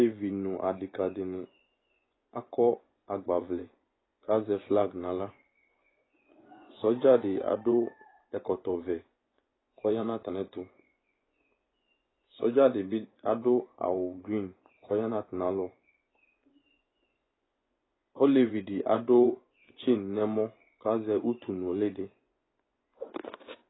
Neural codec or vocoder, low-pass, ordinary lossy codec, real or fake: vocoder, 24 kHz, 100 mel bands, Vocos; 7.2 kHz; AAC, 16 kbps; fake